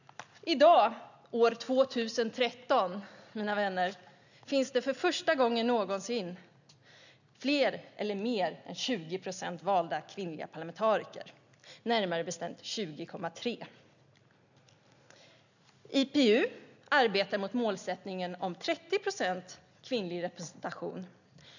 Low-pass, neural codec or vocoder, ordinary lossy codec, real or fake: 7.2 kHz; none; none; real